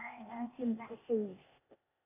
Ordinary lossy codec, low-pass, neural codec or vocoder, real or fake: none; 3.6 kHz; codec, 16 kHz, 0.8 kbps, ZipCodec; fake